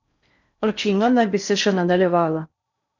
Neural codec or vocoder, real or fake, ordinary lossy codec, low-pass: codec, 16 kHz in and 24 kHz out, 0.6 kbps, FocalCodec, streaming, 4096 codes; fake; none; 7.2 kHz